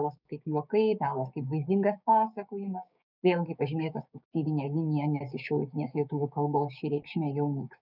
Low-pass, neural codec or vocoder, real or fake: 5.4 kHz; codec, 16 kHz, 6 kbps, DAC; fake